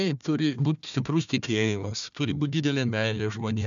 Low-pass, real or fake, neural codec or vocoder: 7.2 kHz; fake; codec, 16 kHz, 1 kbps, FunCodec, trained on Chinese and English, 50 frames a second